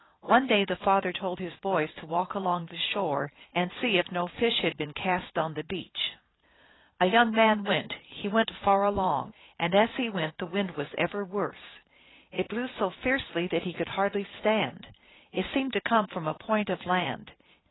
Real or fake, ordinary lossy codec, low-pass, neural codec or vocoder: fake; AAC, 16 kbps; 7.2 kHz; vocoder, 22.05 kHz, 80 mel bands, Vocos